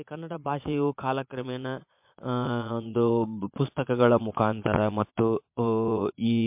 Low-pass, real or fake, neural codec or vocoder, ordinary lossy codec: 3.6 kHz; fake; vocoder, 22.05 kHz, 80 mel bands, Vocos; MP3, 32 kbps